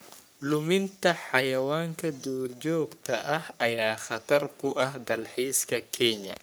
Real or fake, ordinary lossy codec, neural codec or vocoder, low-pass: fake; none; codec, 44.1 kHz, 3.4 kbps, Pupu-Codec; none